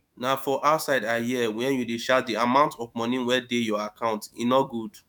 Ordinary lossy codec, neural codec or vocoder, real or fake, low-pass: none; autoencoder, 48 kHz, 128 numbers a frame, DAC-VAE, trained on Japanese speech; fake; 19.8 kHz